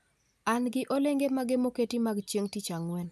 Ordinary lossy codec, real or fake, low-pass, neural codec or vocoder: none; real; 14.4 kHz; none